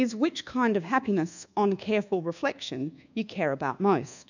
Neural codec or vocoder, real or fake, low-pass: codec, 24 kHz, 1.2 kbps, DualCodec; fake; 7.2 kHz